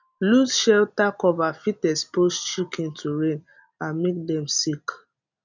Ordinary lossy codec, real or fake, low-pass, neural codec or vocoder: none; real; 7.2 kHz; none